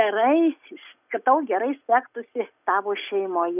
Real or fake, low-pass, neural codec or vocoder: real; 3.6 kHz; none